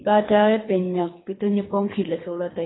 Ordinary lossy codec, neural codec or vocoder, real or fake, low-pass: AAC, 16 kbps; codec, 24 kHz, 3 kbps, HILCodec; fake; 7.2 kHz